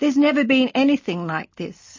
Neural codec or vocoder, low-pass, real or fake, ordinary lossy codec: none; 7.2 kHz; real; MP3, 32 kbps